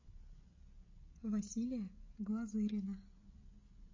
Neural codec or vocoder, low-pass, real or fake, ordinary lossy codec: codec, 16 kHz, 16 kbps, FreqCodec, smaller model; 7.2 kHz; fake; MP3, 32 kbps